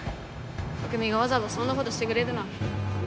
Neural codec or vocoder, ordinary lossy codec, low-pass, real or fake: codec, 16 kHz, 0.9 kbps, LongCat-Audio-Codec; none; none; fake